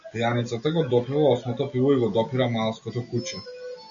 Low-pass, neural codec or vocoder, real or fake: 7.2 kHz; none; real